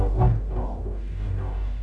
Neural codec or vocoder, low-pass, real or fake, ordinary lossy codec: codec, 44.1 kHz, 0.9 kbps, DAC; 10.8 kHz; fake; none